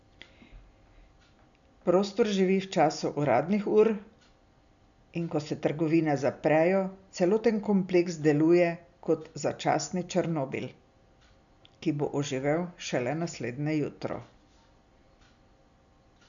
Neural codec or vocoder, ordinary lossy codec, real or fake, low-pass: none; none; real; 7.2 kHz